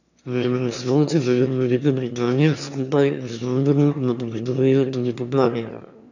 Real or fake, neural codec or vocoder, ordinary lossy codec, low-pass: fake; autoencoder, 22.05 kHz, a latent of 192 numbers a frame, VITS, trained on one speaker; none; 7.2 kHz